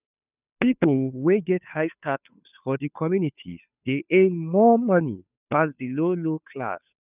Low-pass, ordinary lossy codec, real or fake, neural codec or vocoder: 3.6 kHz; none; fake; codec, 16 kHz, 2 kbps, FunCodec, trained on Chinese and English, 25 frames a second